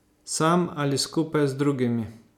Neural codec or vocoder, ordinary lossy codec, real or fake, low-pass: none; none; real; 19.8 kHz